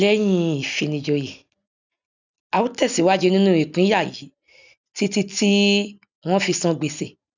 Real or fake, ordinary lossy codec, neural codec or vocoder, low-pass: real; none; none; 7.2 kHz